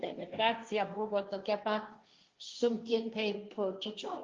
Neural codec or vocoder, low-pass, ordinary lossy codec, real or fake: codec, 16 kHz, 1.1 kbps, Voila-Tokenizer; 7.2 kHz; Opus, 24 kbps; fake